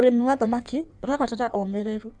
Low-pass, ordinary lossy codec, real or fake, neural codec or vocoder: 9.9 kHz; none; fake; codec, 16 kHz in and 24 kHz out, 1.1 kbps, FireRedTTS-2 codec